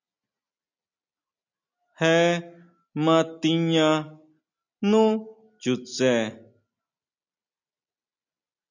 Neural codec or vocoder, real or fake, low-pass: none; real; 7.2 kHz